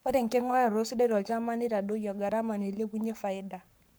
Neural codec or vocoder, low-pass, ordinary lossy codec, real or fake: codec, 44.1 kHz, 7.8 kbps, Pupu-Codec; none; none; fake